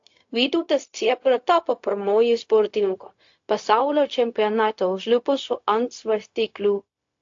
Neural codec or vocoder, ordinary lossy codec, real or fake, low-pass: codec, 16 kHz, 0.4 kbps, LongCat-Audio-Codec; AAC, 48 kbps; fake; 7.2 kHz